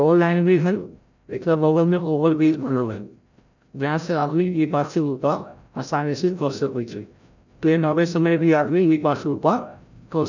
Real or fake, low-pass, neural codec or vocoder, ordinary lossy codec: fake; 7.2 kHz; codec, 16 kHz, 0.5 kbps, FreqCodec, larger model; none